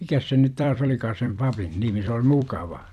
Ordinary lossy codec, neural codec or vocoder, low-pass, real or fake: none; vocoder, 44.1 kHz, 128 mel bands every 256 samples, BigVGAN v2; 14.4 kHz; fake